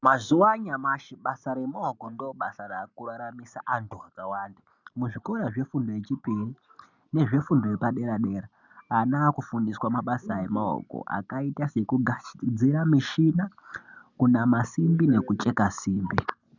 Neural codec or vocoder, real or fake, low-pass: none; real; 7.2 kHz